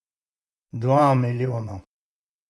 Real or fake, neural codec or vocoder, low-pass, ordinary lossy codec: fake; vocoder, 24 kHz, 100 mel bands, Vocos; none; none